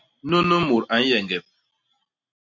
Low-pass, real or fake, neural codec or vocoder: 7.2 kHz; real; none